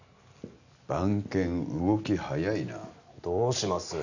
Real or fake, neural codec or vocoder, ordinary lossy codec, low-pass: real; none; none; 7.2 kHz